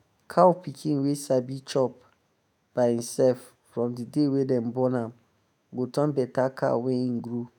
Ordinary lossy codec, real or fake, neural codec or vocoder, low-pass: none; fake; autoencoder, 48 kHz, 128 numbers a frame, DAC-VAE, trained on Japanese speech; none